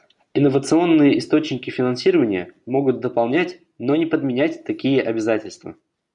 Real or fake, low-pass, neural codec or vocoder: real; 10.8 kHz; none